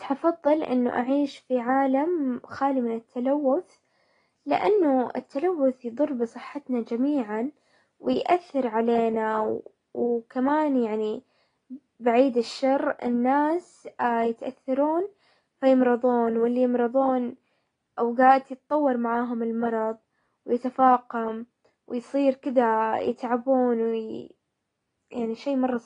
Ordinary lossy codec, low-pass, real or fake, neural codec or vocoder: AAC, 32 kbps; 9.9 kHz; real; none